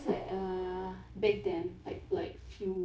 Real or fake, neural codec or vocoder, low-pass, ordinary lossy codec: fake; codec, 16 kHz, 0.9 kbps, LongCat-Audio-Codec; none; none